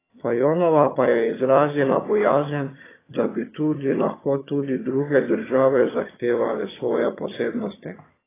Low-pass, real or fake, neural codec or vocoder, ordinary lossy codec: 3.6 kHz; fake; vocoder, 22.05 kHz, 80 mel bands, HiFi-GAN; AAC, 16 kbps